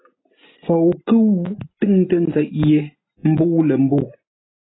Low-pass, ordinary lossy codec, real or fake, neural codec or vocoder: 7.2 kHz; AAC, 16 kbps; real; none